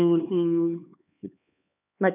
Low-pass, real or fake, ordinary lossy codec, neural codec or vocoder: 3.6 kHz; fake; none; codec, 16 kHz, 2 kbps, X-Codec, HuBERT features, trained on LibriSpeech